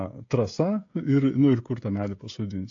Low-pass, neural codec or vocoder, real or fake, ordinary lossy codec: 7.2 kHz; codec, 16 kHz, 16 kbps, FreqCodec, smaller model; fake; AAC, 48 kbps